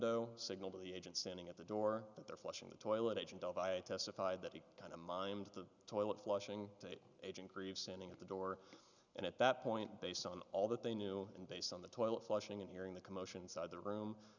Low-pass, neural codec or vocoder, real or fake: 7.2 kHz; none; real